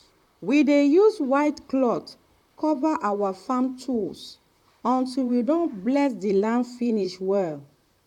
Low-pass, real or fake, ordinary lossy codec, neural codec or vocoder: 19.8 kHz; fake; none; vocoder, 44.1 kHz, 128 mel bands, Pupu-Vocoder